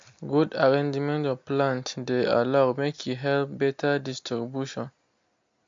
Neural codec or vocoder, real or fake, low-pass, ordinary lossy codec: none; real; 7.2 kHz; MP3, 48 kbps